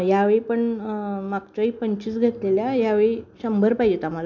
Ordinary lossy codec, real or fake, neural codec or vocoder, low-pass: none; real; none; 7.2 kHz